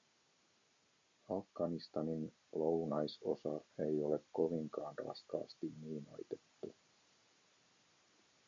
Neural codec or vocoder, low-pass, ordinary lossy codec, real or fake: none; 7.2 kHz; MP3, 48 kbps; real